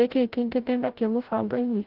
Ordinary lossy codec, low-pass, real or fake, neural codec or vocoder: Opus, 16 kbps; 5.4 kHz; fake; codec, 16 kHz, 0.5 kbps, FreqCodec, larger model